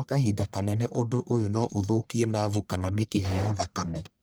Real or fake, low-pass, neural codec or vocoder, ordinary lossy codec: fake; none; codec, 44.1 kHz, 1.7 kbps, Pupu-Codec; none